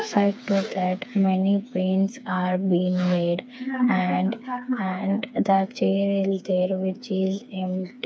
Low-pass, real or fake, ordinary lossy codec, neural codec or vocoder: none; fake; none; codec, 16 kHz, 4 kbps, FreqCodec, smaller model